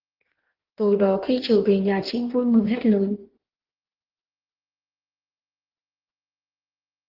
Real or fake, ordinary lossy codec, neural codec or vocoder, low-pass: fake; Opus, 16 kbps; codec, 16 kHz in and 24 kHz out, 1.1 kbps, FireRedTTS-2 codec; 5.4 kHz